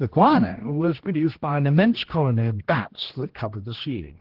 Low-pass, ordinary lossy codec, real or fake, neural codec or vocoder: 5.4 kHz; Opus, 16 kbps; fake; codec, 16 kHz, 1 kbps, X-Codec, HuBERT features, trained on general audio